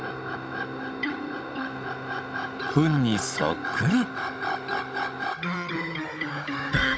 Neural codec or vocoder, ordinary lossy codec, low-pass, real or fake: codec, 16 kHz, 16 kbps, FunCodec, trained on Chinese and English, 50 frames a second; none; none; fake